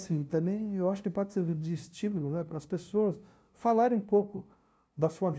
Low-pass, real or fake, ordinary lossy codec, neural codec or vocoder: none; fake; none; codec, 16 kHz, 0.5 kbps, FunCodec, trained on LibriTTS, 25 frames a second